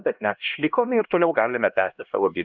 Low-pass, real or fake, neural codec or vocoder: 7.2 kHz; fake; codec, 16 kHz, 1 kbps, X-Codec, HuBERT features, trained on LibriSpeech